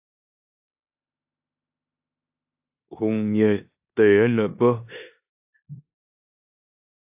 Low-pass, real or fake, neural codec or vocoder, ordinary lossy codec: 3.6 kHz; fake; codec, 16 kHz in and 24 kHz out, 0.9 kbps, LongCat-Audio-Codec, four codebook decoder; AAC, 32 kbps